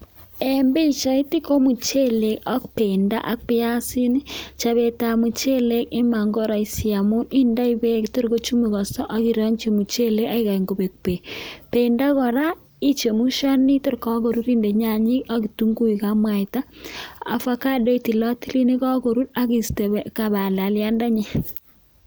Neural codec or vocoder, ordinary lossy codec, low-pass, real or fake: none; none; none; real